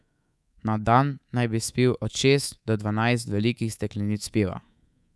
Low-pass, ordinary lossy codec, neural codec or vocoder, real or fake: none; none; codec, 24 kHz, 3.1 kbps, DualCodec; fake